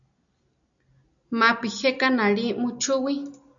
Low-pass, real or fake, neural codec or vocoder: 7.2 kHz; real; none